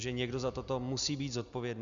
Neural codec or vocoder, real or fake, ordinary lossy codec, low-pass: none; real; Opus, 64 kbps; 7.2 kHz